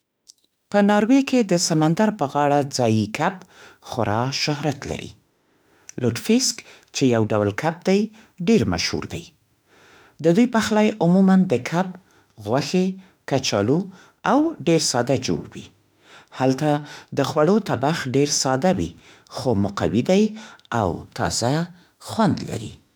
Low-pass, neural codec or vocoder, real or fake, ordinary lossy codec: none; autoencoder, 48 kHz, 32 numbers a frame, DAC-VAE, trained on Japanese speech; fake; none